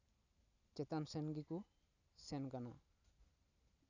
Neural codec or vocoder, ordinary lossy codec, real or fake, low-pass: none; none; real; 7.2 kHz